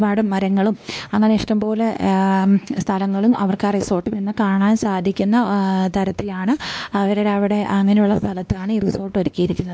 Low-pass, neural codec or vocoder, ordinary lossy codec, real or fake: none; codec, 16 kHz, 2 kbps, X-Codec, WavLM features, trained on Multilingual LibriSpeech; none; fake